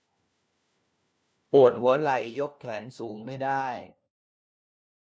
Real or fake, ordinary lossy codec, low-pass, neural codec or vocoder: fake; none; none; codec, 16 kHz, 1 kbps, FunCodec, trained on LibriTTS, 50 frames a second